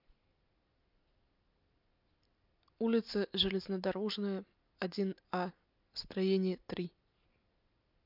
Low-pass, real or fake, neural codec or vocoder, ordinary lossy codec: 5.4 kHz; real; none; MP3, 48 kbps